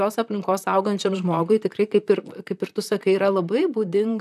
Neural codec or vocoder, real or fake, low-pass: vocoder, 44.1 kHz, 128 mel bands, Pupu-Vocoder; fake; 14.4 kHz